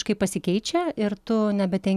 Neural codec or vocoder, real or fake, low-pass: none; real; 14.4 kHz